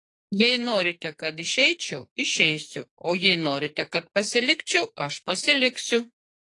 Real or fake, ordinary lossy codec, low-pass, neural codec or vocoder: fake; AAC, 48 kbps; 10.8 kHz; codec, 44.1 kHz, 2.6 kbps, SNAC